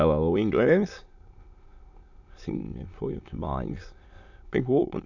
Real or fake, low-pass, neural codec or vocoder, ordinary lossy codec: fake; 7.2 kHz; autoencoder, 22.05 kHz, a latent of 192 numbers a frame, VITS, trained on many speakers; none